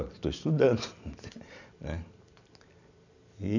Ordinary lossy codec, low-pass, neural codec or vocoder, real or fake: none; 7.2 kHz; none; real